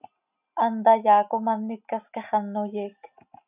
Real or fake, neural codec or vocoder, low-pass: real; none; 3.6 kHz